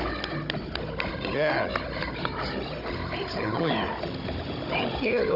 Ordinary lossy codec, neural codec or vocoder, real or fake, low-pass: none; codec, 16 kHz, 16 kbps, FunCodec, trained on Chinese and English, 50 frames a second; fake; 5.4 kHz